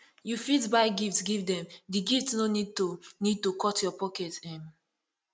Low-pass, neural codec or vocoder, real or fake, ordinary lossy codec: none; none; real; none